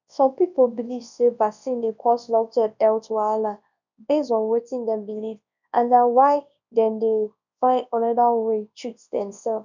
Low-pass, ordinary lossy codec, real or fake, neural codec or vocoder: 7.2 kHz; none; fake; codec, 24 kHz, 0.9 kbps, WavTokenizer, large speech release